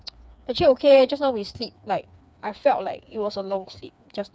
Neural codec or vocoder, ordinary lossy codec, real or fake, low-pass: codec, 16 kHz, 4 kbps, FreqCodec, smaller model; none; fake; none